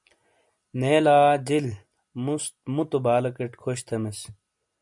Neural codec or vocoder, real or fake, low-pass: none; real; 10.8 kHz